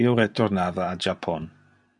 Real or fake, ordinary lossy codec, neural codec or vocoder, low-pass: real; MP3, 64 kbps; none; 10.8 kHz